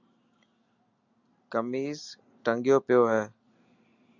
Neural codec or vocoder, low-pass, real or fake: none; 7.2 kHz; real